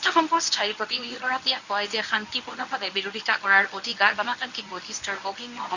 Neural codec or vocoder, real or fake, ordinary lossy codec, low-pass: codec, 24 kHz, 0.9 kbps, WavTokenizer, medium speech release version 1; fake; none; 7.2 kHz